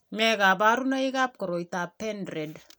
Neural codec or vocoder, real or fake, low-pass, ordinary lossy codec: none; real; none; none